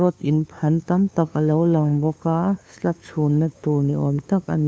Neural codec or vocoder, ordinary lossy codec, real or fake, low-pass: codec, 16 kHz, 2 kbps, FunCodec, trained on LibriTTS, 25 frames a second; none; fake; none